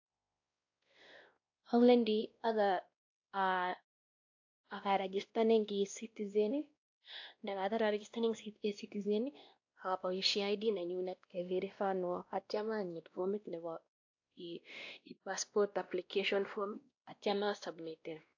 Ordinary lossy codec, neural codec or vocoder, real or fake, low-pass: AAC, 48 kbps; codec, 16 kHz, 1 kbps, X-Codec, WavLM features, trained on Multilingual LibriSpeech; fake; 7.2 kHz